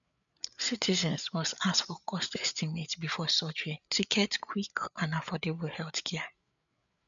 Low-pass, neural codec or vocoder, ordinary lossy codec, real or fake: 7.2 kHz; codec, 16 kHz, 8 kbps, FreqCodec, larger model; none; fake